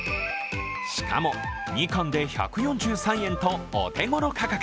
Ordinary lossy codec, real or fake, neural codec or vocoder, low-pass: none; real; none; none